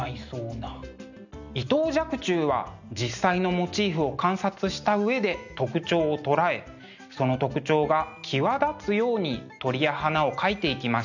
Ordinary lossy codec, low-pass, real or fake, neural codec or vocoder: none; 7.2 kHz; real; none